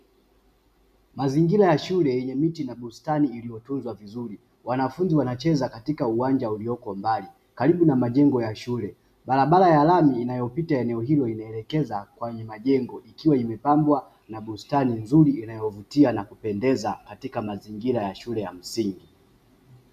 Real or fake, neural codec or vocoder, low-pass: real; none; 14.4 kHz